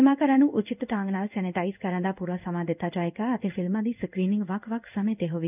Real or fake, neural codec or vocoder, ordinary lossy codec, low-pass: fake; codec, 16 kHz in and 24 kHz out, 1 kbps, XY-Tokenizer; none; 3.6 kHz